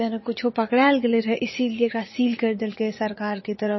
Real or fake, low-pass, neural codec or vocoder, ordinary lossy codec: fake; 7.2 kHz; vocoder, 44.1 kHz, 80 mel bands, Vocos; MP3, 24 kbps